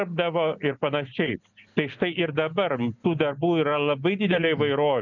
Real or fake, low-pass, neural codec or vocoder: real; 7.2 kHz; none